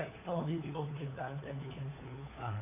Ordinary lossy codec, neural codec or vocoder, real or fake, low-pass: MP3, 32 kbps; codec, 16 kHz, 4 kbps, FunCodec, trained on LibriTTS, 50 frames a second; fake; 3.6 kHz